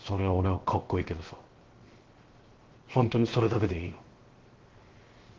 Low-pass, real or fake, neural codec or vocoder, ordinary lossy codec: 7.2 kHz; fake; codec, 16 kHz, 0.7 kbps, FocalCodec; Opus, 16 kbps